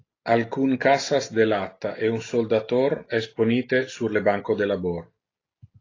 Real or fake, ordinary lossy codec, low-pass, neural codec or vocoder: real; AAC, 32 kbps; 7.2 kHz; none